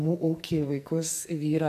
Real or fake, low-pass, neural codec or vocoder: fake; 14.4 kHz; codec, 44.1 kHz, 2.6 kbps, SNAC